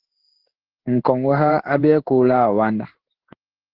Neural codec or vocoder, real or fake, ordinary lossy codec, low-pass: codec, 16 kHz in and 24 kHz out, 1 kbps, XY-Tokenizer; fake; Opus, 16 kbps; 5.4 kHz